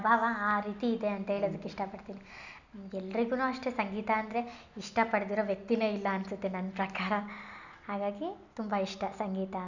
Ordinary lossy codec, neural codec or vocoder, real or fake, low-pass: none; none; real; 7.2 kHz